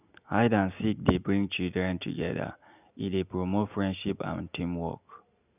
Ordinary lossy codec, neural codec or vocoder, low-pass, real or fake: none; none; 3.6 kHz; real